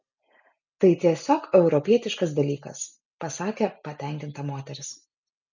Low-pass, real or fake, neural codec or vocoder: 7.2 kHz; real; none